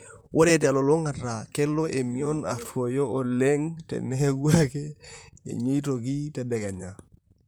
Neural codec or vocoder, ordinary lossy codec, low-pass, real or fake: vocoder, 44.1 kHz, 128 mel bands, Pupu-Vocoder; none; none; fake